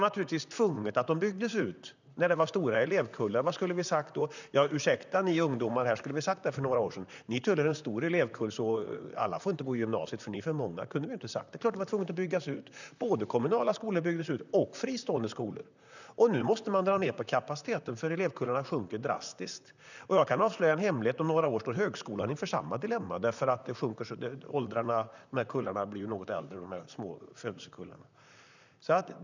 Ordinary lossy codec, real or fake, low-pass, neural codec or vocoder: none; fake; 7.2 kHz; vocoder, 44.1 kHz, 128 mel bands, Pupu-Vocoder